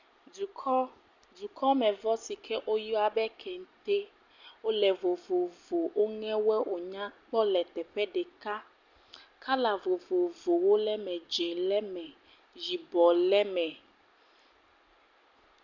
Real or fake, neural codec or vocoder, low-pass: real; none; 7.2 kHz